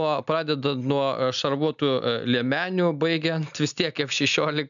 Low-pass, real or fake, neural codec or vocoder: 7.2 kHz; real; none